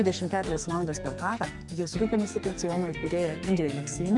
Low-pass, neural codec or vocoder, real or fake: 10.8 kHz; codec, 44.1 kHz, 2.6 kbps, SNAC; fake